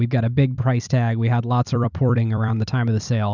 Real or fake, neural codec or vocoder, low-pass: fake; vocoder, 44.1 kHz, 128 mel bands every 256 samples, BigVGAN v2; 7.2 kHz